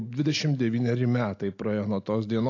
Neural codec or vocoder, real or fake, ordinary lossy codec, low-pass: none; real; AAC, 48 kbps; 7.2 kHz